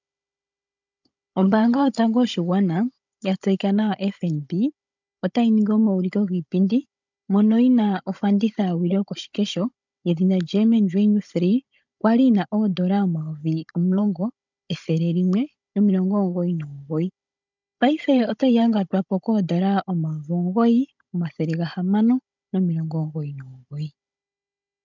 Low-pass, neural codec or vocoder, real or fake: 7.2 kHz; codec, 16 kHz, 16 kbps, FunCodec, trained on Chinese and English, 50 frames a second; fake